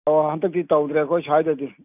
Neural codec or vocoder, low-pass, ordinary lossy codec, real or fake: none; 3.6 kHz; none; real